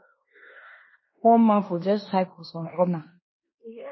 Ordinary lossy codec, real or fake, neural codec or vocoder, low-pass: MP3, 24 kbps; fake; codec, 16 kHz in and 24 kHz out, 0.9 kbps, LongCat-Audio-Codec, fine tuned four codebook decoder; 7.2 kHz